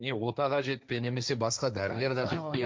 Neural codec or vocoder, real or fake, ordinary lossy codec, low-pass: codec, 16 kHz, 1.1 kbps, Voila-Tokenizer; fake; none; none